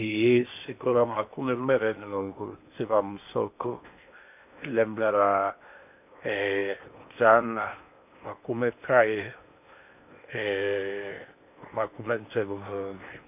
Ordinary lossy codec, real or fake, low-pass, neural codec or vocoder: none; fake; 3.6 kHz; codec, 16 kHz in and 24 kHz out, 0.8 kbps, FocalCodec, streaming, 65536 codes